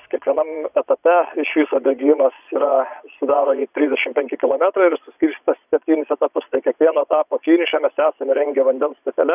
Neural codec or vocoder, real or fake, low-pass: vocoder, 22.05 kHz, 80 mel bands, Vocos; fake; 3.6 kHz